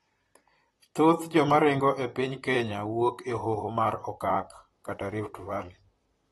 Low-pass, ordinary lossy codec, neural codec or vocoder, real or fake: 19.8 kHz; AAC, 32 kbps; vocoder, 44.1 kHz, 128 mel bands, Pupu-Vocoder; fake